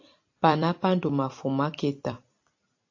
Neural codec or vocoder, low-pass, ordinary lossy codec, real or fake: vocoder, 44.1 kHz, 128 mel bands every 256 samples, BigVGAN v2; 7.2 kHz; AAC, 48 kbps; fake